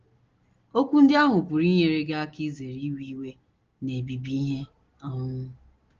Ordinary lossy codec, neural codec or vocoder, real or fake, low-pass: Opus, 16 kbps; none; real; 7.2 kHz